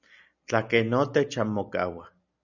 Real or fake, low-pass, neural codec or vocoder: real; 7.2 kHz; none